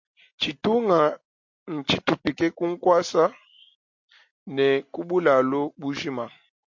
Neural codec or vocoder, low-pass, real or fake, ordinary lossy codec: none; 7.2 kHz; real; MP3, 48 kbps